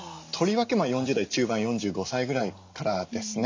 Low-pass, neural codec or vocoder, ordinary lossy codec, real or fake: 7.2 kHz; none; MP3, 64 kbps; real